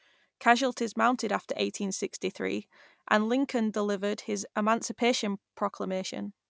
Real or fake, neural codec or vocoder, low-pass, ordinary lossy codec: real; none; none; none